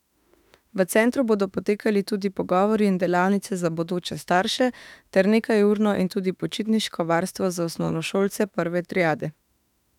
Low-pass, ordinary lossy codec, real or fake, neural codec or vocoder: 19.8 kHz; none; fake; autoencoder, 48 kHz, 32 numbers a frame, DAC-VAE, trained on Japanese speech